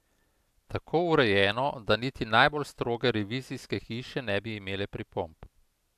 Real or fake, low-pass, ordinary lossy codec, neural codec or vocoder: real; 14.4 kHz; AAC, 96 kbps; none